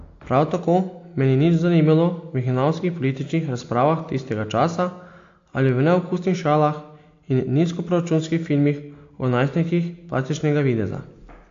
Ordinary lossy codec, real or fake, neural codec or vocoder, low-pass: AAC, 48 kbps; real; none; 7.2 kHz